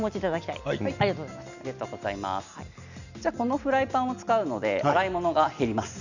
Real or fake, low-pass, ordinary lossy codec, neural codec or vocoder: real; 7.2 kHz; none; none